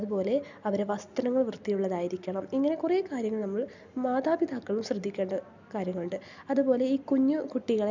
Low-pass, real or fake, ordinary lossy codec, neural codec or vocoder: 7.2 kHz; real; none; none